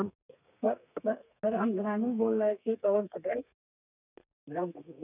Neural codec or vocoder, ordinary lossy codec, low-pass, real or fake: codec, 32 kHz, 1.9 kbps, SNAC; none; 3.6 kHz; fake